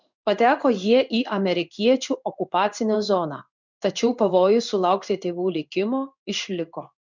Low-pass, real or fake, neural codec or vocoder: 7.2 kHz; fake; codec, 16 kHz in and 24 kHz out, 1 kbps, XY-Tokenizer